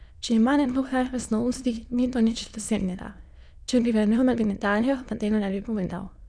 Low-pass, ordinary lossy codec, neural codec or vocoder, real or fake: 9.9 kHz; none; autoencoder, 22.05 kHz, a latent of 192 numbers a frame, VITS, trained on many speakers; fake